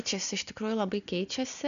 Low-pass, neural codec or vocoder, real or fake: 7.2 kHz; none; real